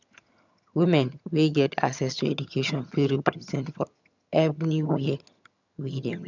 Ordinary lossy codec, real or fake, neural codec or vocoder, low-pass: none; fake; vocoder, 22.05 kHz, 80 mel bands, HiFi-GAN; 7.2 kHz